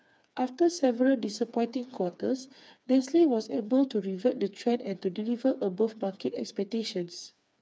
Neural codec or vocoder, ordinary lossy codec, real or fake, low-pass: codec, 16 kHz, 4 kbps, FreqCodec, smaller model; none; fake; none